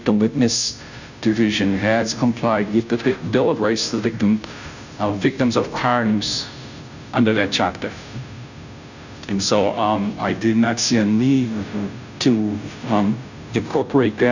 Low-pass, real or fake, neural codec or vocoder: 7.2 kHz; fake; codec, 16 kHz, 0.5 kbps, FunCodec, trained on Chinese and English, 25 frames a second